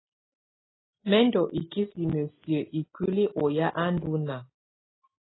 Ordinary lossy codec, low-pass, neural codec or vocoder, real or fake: AAC, 16 kbps; 7.2 kHz; none; real